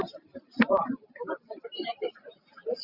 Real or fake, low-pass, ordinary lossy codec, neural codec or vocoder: fake; 5.4 kHz; Opus, 64 kbps; vocoder, 44.1 kHz, 128 mel bands every 512 samples, BigVGAN v2